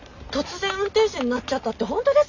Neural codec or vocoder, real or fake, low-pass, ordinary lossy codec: none; real; 7.2 kHz; none